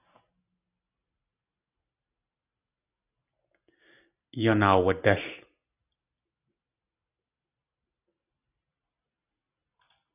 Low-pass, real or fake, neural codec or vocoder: 3.6 kHz; real; none